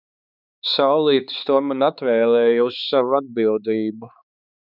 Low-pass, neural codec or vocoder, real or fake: 5.4 kHz; codec, 16 kHz, 4 kbps, X-Codec, HuBERT features, trained on balanced general audio; fake